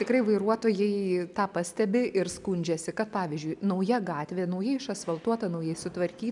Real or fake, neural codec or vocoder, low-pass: real; none; 10.8 kHz